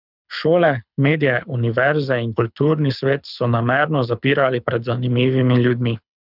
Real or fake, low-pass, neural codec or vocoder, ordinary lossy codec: fake; 5.4 kHz; codec, 24 kHz, 6 kbps, HILCodec; none